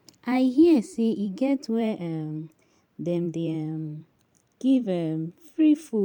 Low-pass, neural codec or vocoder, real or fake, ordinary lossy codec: 19.8 kHz; vocoder, 44.1 kHz, 128 mel bands every 512 samples, BigVGAN v2; fake; none